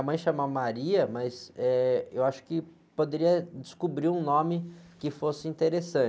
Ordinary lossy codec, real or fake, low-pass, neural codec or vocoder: none; real; none; none